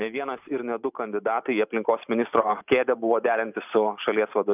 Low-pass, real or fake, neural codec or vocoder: 3.6 kHz; fake; vocoder, 44.1 kHz, 128 mel bands every 512 samples, BigVGAN v2